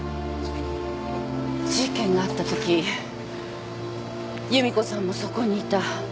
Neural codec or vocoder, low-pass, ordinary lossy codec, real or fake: none; none; none; real